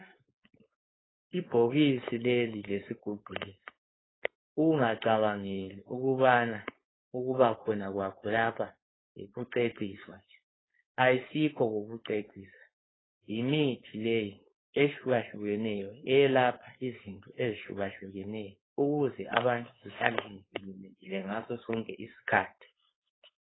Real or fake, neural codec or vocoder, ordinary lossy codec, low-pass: fake; codec, 16 kHz, 4.8 kbps, FACodec; AAC, 16 kbps; 7.2 kHz